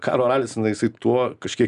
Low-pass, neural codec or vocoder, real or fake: 10.8 kHz; none; real